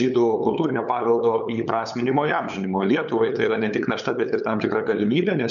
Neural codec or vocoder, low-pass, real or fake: codec, 16 kHz, 8 kbps, FunCodec, trained on LibriTTS, 25 frames a second; 7.2 kHz; fake